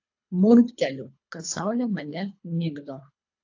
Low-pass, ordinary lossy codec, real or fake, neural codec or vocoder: 7.2 kHz; AAC, 48 kbps; fake; codec, 24 kHz, 3 kbps, HILCodec